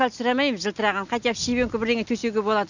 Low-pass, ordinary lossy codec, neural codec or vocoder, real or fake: 7.2 kHz; none; none; real